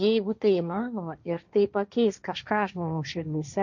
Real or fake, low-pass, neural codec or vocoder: fake; 7.2 kHz; codec, 16 kHz, 1.1 kbps, Voila-Tokenizer